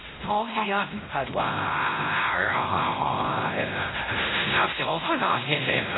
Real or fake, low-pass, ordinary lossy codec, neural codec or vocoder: fake; 7.2 kHz; AAC, 16 kbps; codec, 16 kHz, 0.5 kbps, X-Codec, WavLM features, trained on Multilingual LibriSpeech